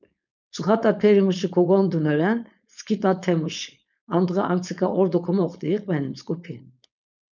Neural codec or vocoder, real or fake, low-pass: codec, 16 kHz, 4.8 kbps, FACodec; fake; 7.2 kHz